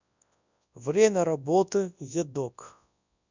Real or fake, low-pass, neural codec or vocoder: fake; 7.2 kHz; codec, 24 kHz, 0.9 kbps, WavTokenizer, large speech release